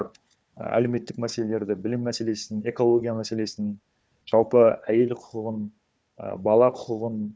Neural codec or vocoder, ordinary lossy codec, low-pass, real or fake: codec, 16 kHz, 4 kbps, FunCodec, trained on Chinese and English, 50 frames a second; none; none; fake